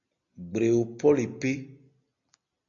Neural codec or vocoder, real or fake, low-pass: none; real; 7.2 kHz